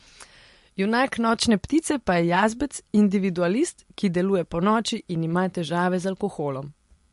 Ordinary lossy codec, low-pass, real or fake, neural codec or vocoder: MP3, 48 kbps; 14.4 kHz; real; none